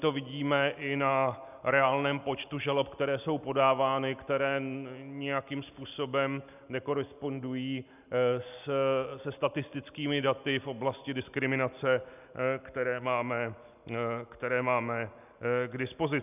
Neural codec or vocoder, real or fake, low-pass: none; real; 3.6 kHz